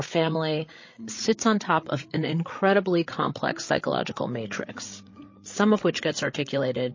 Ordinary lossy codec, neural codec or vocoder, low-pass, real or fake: MP3, 32 kbps; codec, 16 kHz, 8 kbps, FreqCodec, larger model; 7.2 kHz; fake